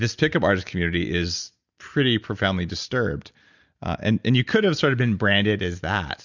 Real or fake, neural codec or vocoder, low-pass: real; none; 7.2 kHz